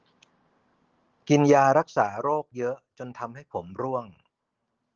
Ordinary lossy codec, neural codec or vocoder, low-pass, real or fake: Opus, 16 kbps; none; 7.2 kHz; real